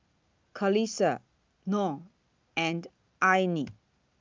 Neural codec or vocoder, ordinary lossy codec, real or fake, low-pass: none; Opus, 24 kbps; real; 7.2 kHz